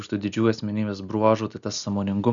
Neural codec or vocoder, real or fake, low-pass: none; real; 7.2 kHz